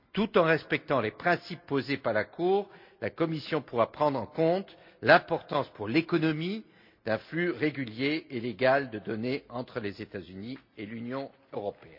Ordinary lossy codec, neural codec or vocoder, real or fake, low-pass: MP3, 48 kbps; none; real; 5.4 kHz